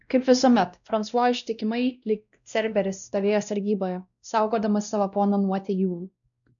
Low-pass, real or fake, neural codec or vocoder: 7.2 kHz; fake; codec, 16 kHz, 1 kbps, X-Codec, WavLM features, trained on Multilingual LibriSpeech